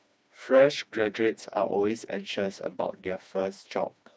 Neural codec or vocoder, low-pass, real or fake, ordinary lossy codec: codec, 16 kHz, 2 kbps, FreqCodec, smaller model; none; fake; none